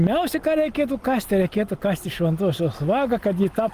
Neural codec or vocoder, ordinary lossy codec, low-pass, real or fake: none; Opus, 32 kbps; 14.4 kHz; real